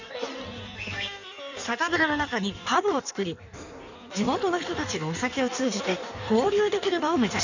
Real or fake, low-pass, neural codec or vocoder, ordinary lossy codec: fake; 7.2 kHz; codec, 16 kHz in and 24 kHz out, 1.1 kbps, FireRedTTS-2 codec; none